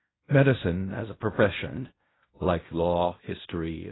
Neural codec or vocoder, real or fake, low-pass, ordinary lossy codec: codec, 16 kHz in and 24 kHz out, 0.4 kbps, LongCat-Audio-Codec, fine tuned four codebook decoder; fake; 7.2 kHz; AAC, 16 kbps